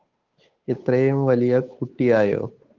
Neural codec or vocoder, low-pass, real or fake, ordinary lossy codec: codec, 16 kHz, 8 kbps, FunCodec, trained on Chinese and English, 25 frames a second; 7.2 kHz; fake; Opus, 24 kbps